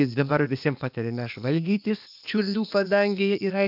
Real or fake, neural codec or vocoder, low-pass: fake; codec, 16 kHz, 0.8 kbps, ZipCodec; 5.4 kHz